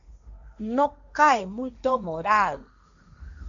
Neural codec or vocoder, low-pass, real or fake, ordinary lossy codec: codec, 16 kHz, 1.1 kbps, Voila-Tokenizer; 7.2 kHz; fake; MP3, 96 kbps